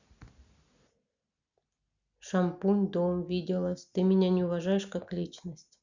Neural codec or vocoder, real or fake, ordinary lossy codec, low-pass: none; real; Opus, 64 kbps; 7.2 kHz